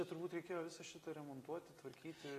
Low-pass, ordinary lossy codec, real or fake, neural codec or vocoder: 14.4 kHz; AAC, 48 kbps; real; none